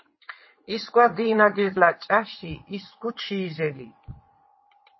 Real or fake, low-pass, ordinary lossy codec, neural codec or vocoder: fake; 7.2 kHz; MP3, 24 kbps; vocoder, 22.05 kHz, 80 mel bands, WaveNeXt